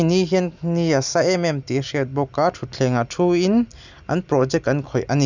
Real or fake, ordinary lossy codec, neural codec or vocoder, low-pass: real; none; none; 7.2 kHz